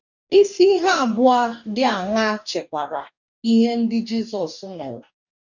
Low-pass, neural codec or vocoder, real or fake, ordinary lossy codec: 7.2 kHz; codec, 44.1 kHz, 2.6 kbps, DAC; fake; none